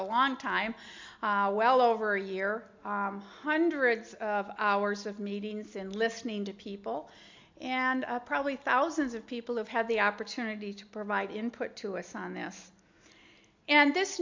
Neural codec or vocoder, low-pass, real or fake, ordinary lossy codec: none; 7.2 kHz; real; MP3, 64 kbps